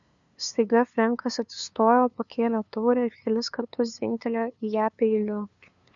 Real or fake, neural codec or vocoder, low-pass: fake; codec, 16 kHz, 2 kbps, FunCodec, trained on LibriTTS, 25 frames a second; 7.2 kHz